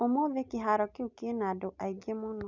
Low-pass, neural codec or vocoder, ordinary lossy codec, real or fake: 7.2 kHz; none; none; real